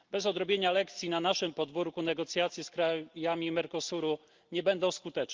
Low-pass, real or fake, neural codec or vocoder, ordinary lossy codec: 7.2 kHz; real; none; Opus, 32 kbps